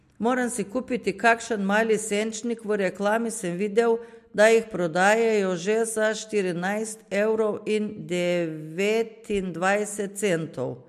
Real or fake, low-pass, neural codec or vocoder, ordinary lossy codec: real; 14.4 kHz; none; MP3, 64 kbps